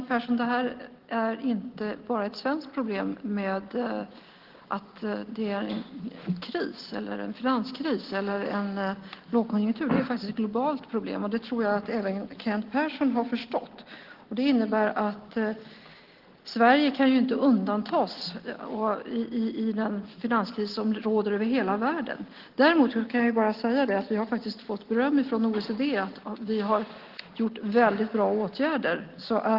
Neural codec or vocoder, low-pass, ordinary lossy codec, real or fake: none; 5.4 kHz; Opus, 16 kbps; real